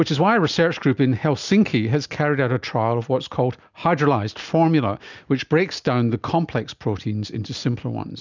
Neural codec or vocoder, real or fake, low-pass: none; real; 7.2 kHz